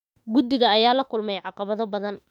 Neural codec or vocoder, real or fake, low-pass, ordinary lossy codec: autoencoder, 48 kHz, 32 numbers a frame, DAC-VAE, trained on Japanese speech; fake; 19.8 kHz; none